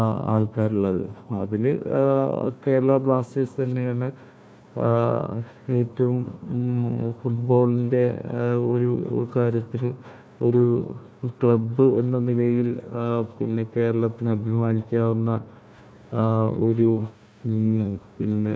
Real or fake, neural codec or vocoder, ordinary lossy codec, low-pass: fake; codec, 16 kHz, 1 kbps, FunCodec, trained on Chinese and English, 50 frames a second; none; none